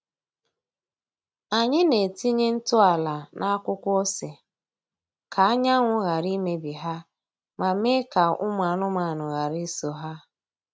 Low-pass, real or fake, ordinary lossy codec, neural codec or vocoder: none; real; none; none